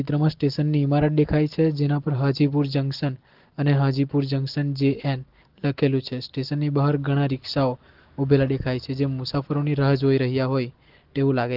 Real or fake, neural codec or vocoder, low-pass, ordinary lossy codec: real; none; 5.4 kHz; Opus, 16 kbps